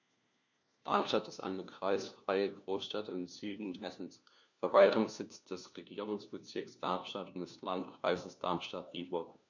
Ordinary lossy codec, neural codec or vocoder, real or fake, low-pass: none; codec, 16 kHz, 1 kbps, FunCodec, trained on LibriTTS, 50 frames a second; fake; 7.2 kHz